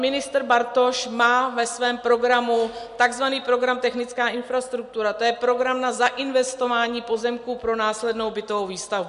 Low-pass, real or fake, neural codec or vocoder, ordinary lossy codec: 10.8 kHz; real; none; MP3, 64 kbps